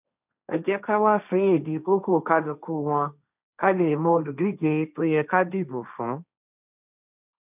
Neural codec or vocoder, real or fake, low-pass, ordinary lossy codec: codec, 16 kHz, 1.1 kbps, Voila-Tokenizer; fake; 3.6 kHz; none